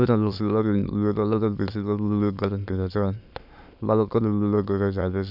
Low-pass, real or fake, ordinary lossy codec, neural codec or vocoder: 5.4 kHz; fake; AAC, 48 kbps; autoencoder, 22.05 kHz, a latent of 192 numbers a frame, VITS, trained on many speakers